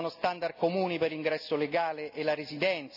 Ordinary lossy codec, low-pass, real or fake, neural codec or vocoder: none; 5.4 kHz; real; none